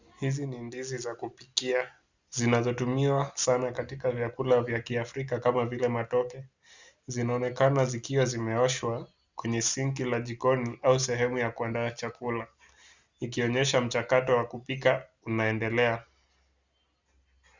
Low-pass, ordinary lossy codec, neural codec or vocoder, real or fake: 7.2 kHz; Opus, 64 kbps; none; real